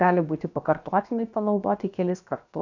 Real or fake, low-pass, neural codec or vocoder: fake; 7.2 kHz; codec, 16 kHz, about 1 kbps, DyCAST, with the encoder's durations